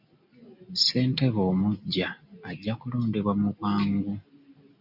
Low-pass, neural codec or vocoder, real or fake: 5.4 kHz; none; real